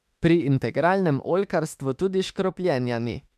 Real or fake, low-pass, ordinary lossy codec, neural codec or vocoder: fake; 14.4 kHz; MP3, 96 kbps; autoencoder, 48 kHz, 32 numbers a frame, DAC-VAE, trained on Japanese speech